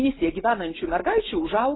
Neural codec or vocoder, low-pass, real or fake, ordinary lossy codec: none; 7.2 kHz; real; AAC, 16 kbps